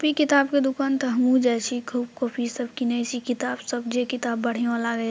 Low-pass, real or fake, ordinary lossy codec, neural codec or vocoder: none; real; none; none